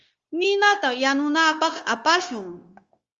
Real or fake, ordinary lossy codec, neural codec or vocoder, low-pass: fake; Opus, 32 kbps; codec, 16 kHz, 0.9 kbps, LongCat-Audio-Codec; 7.2 kHz